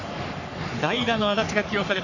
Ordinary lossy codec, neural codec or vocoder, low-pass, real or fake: none; codec, 44.1 kHz, 3.4 kbps, Pupu-Codec; 7.2 kHz; fake